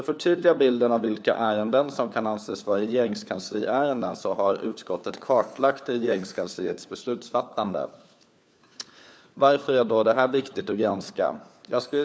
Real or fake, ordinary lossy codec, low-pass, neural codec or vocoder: fake; none; none; codec, 16 kHz, 4 kbps, FunCodec, trained on LibriTTS, 50 frames a second